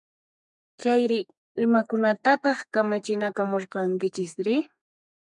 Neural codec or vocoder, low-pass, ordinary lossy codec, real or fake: codec, 32 kHz, 1.9 kbps, SNAC; 10.8 kHz; MP3, 96 kbps; fake